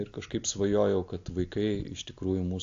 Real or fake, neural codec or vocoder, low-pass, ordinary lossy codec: real; none; 7.2 kHz; AAC, 48 kbps